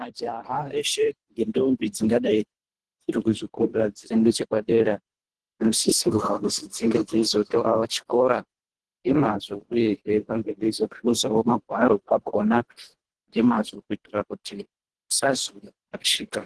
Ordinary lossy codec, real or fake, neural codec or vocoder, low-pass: Opus, 16 kbps; fake; codec, 24 kHz, 1.5 kbps, HILCodec; 10.8 kHz